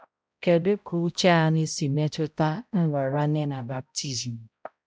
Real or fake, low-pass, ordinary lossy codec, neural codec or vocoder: fake; none; none; codec, 16 kHz, 0.5 kbps, X-Codec, HuBERT features, trained on balanced general audio